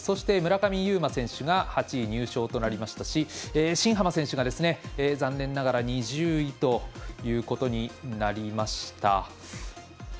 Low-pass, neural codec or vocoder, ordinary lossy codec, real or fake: none; none; none; real